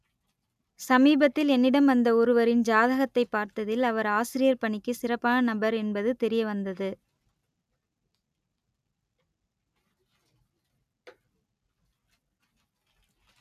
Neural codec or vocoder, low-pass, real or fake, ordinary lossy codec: none; 14.4 kHz; real; none